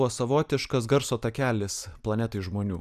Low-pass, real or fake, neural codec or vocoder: 14.4 kHz; real; none